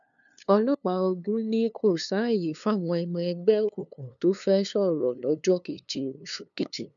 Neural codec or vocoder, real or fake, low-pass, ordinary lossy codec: codec, 16 kHz, 2 kbps, FunCodec, trained on LibriTTS, 25 frames a second; fake; 7.2 kHz; none